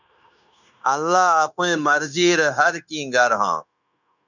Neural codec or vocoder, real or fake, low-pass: codec, 16 kHz, 0.9 kbps, LongCat-Audio-Codec; fake; 7.2 kHz